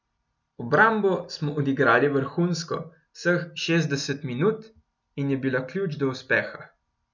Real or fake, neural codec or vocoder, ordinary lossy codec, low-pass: real; none; none; 7.2 kHz